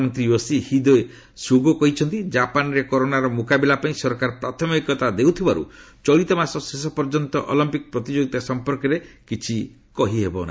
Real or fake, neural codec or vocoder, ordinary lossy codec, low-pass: real; none; none; none